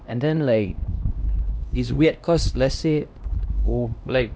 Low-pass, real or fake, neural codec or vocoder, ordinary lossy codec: none; fake; codec, 16 kHz, 1 kbps, X-Codec, HuBERT features, trained on LibriSpeech; none